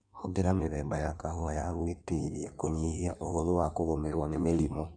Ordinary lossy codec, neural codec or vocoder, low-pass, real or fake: none; codec, 16 kHz in and 24 kHz out, 1.1 kbps, FireRedTTS-2 codec; 9.9 kHz; fake